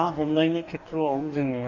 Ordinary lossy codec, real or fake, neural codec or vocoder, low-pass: none; fake; codec, 44.1 kHz, 2.6 kbps, DAC; 7.2 kHz